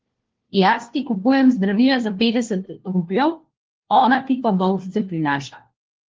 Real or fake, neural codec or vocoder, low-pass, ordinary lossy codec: fake; codec, 16 kHz, 1 kbps, FunCodec, trained on LibriTTS, 50 frames a second; 7.2 kHz; Opus, 16 kbps